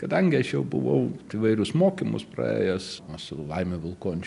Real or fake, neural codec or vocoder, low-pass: real; none; 10.8 kHz